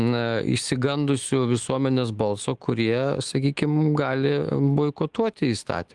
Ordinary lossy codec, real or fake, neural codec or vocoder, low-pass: Opus, 24 kbps; fake; autoencoder, 48 kHz, 128 numbers a frame, DAC-VAE, trained on Japanese speech; 10.8 kHz